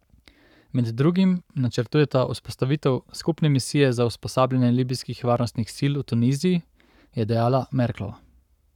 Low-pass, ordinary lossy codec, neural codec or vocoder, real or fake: 19.8 kHz; none; codec, 44.1 kHz, 7.8 kbps, Pupu-Codec; fake